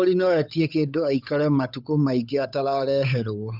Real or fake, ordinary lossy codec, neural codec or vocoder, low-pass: fake; none; codec, 16 kHz, 8 kbps, FunCodec, trained on Chinese and English, 25 frames a second; 5.4 kHz